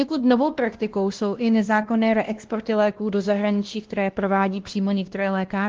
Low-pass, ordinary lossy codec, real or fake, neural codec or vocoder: 7.2 kHz; Opus, 16 kbps; fake; codec, 16 kHz, 1 kbps, X-Codec, WavLM features, trained on Multilingual LibriSpeech